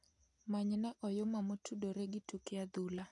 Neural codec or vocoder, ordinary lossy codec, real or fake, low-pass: none; none; real; 10.8 kHz